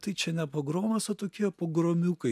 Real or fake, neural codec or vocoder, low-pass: real; none; 14.4 kHz